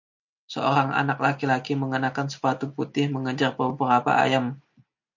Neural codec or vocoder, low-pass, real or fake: none; 7.2 kHz; real